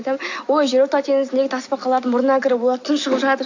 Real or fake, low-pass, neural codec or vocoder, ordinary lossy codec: real; 7.2 kHz; none; AAC, 48 kbps